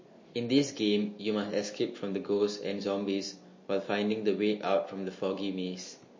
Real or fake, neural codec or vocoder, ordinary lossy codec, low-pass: real; none; MP3, 32 kbps; 7.2 kHz